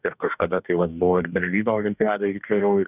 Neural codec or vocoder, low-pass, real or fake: codec, 44.1 kHz, 2.6 kbps, DAC; 3.6 kHz; fake